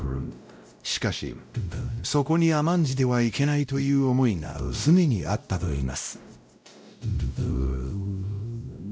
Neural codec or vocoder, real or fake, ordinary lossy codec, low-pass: codec, 16 kHz, 0.5 kbps, X-Codec, WavLM features, trained on Multilingual LibriSpeech; fake; none; none